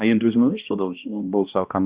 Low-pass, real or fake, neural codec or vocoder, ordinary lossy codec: 3.6 kHz; fake; codec, 16 kHz, 1 kbps, X-Codec, HuBERT features, trained on balanced general audio; Opus, 64 kbps